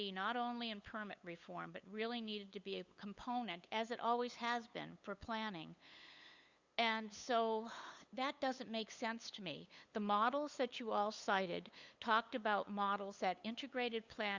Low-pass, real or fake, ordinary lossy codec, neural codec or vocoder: 7.2 kHz; fake; Opus, 64 kbps; codec, 16 kHz, 8 kbps, FunCodec, trained on LibriTTS, 25 frames a second